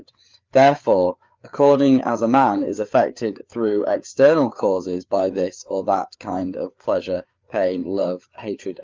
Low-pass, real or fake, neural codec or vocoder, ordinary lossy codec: 7.2 kHz; fake; codec, 16 kHz, 4 kbps, FreqCodec, larger model; Opus, 24 kbps